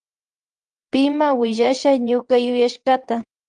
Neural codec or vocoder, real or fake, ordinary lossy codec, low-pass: vocoder, 22.05 kHz, 80 mel bands, WaveNeXt; fake; Opus, 32 kbps; 9.9 kHz